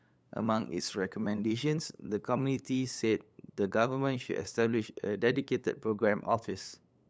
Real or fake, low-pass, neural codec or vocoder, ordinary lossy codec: fake; none; codec, 16 kHz, 8 kbps, FunCodec, trained on LibriTTS, 25 frames a second; none